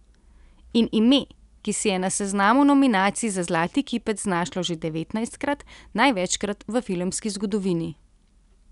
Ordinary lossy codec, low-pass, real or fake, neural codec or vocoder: none; 10.8 kHz; real; none